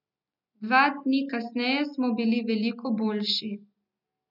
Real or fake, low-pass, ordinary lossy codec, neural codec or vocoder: real; 5.4 kHz; none; none